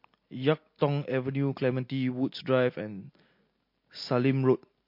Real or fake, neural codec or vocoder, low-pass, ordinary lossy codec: real; none; 5.4 kHz; MP3, 32 kbps